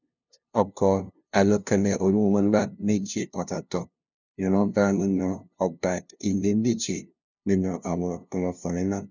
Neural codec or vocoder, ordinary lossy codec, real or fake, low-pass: codec, 16 kHz, 0.5 kbps, FunCodec, trained on LibriTTS, 25 frames a second; none; fake; 7.2 kHz